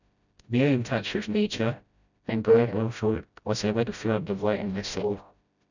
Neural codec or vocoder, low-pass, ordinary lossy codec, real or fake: codec, 16 kHz, 0.5 kbps, FreqCodec, smaller model; 7.2 kHz; none; fake